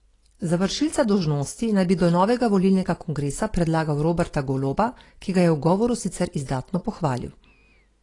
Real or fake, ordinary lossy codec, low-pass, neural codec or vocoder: real; AAC, 32 kbps; 10.8 kHz; none